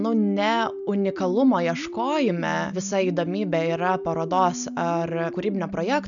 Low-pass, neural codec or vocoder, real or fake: 7.2 kHz; none; real